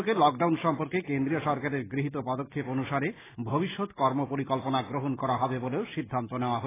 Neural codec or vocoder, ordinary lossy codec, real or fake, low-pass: none; AAC, 16 kbps; real; 3.6 kHz